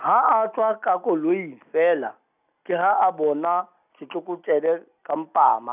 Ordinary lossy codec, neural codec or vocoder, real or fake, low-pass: none; none; real; 3.6 kHz